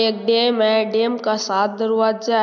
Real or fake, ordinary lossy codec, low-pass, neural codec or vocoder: real; none; 7.2 kHz; none